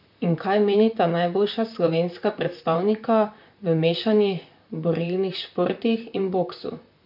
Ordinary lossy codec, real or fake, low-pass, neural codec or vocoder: MP3, 48 kbps; fake; 5.4 kHz; vocoder, 44.1 kHz, 128 mel bands, Pupu-Vocoder